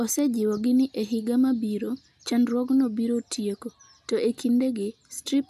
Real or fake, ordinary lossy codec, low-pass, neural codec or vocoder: real; none; 14.4 kHz; none